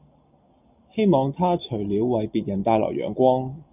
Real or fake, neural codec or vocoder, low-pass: real; none; 3.6 kHz